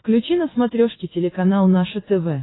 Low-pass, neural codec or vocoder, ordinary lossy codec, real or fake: 7.2 kHz; none; AAC, 16 kbps; real